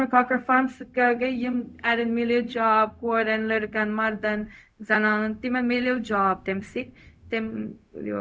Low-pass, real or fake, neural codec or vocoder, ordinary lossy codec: none; fake; codec, 16 kHz, 0.4 kbps, LongCat-Audio-Codec; none